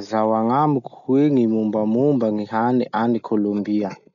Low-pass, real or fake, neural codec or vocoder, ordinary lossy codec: 7.2 kHz; real; none; none